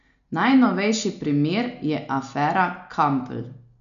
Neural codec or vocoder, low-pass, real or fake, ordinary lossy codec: none; 7.2 kHz; real; none